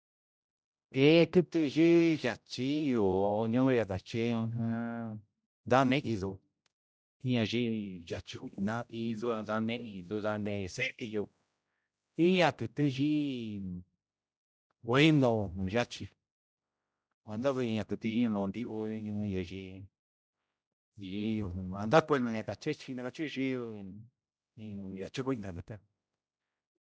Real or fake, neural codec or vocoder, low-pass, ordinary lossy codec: fake; codec, 16 kHz, 0.5 kbps, X-Codec, HuBERT features, trained on general audio; none; none